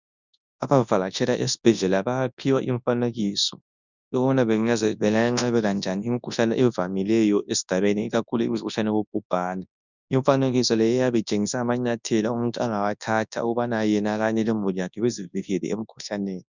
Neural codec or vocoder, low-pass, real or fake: codec, 24 kHz, 0.9 kbps, WavTokenizer, large speech release; 7.2 kHz; fake